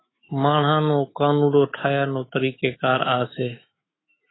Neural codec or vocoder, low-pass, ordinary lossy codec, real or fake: autoencoder, 48 kHz, 128 numbers a frame, DAC-VAE, trained on Japanese speech; 7.2 kHz; AAC, 16 kbps; fake